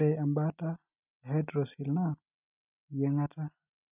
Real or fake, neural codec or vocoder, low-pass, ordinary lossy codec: real; none; 3.6 kHz; none